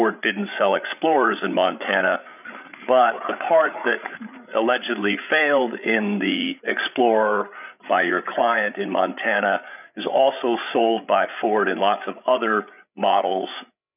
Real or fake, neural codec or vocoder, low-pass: fake; codec, 16 kHz, 8 kbps, FreqCodec, larger model; 3.6 kHz